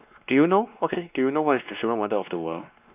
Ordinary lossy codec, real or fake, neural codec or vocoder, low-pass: none; fake; codec, 16 kHz, 2 kbps, X-Codec, WavLM features, trained on Multilingual LibriSpeech; 3.6 kHz